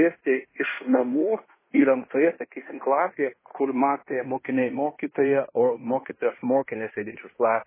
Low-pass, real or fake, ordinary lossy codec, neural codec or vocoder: 3.6 kHz; fake; MP3, 16 kbps; codec, 16 kHz in and 24 kHz out, 0.9 kbps, LongCat-Audio-Codec, fine tuned four codebook decoder